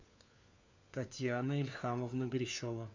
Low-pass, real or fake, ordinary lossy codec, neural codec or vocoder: 7.2 kHz; fake; MP3, 64 kbps; codec, 16 kHz, 4 kbps, FunCodec, trained on LibriTTS, 50 frames a second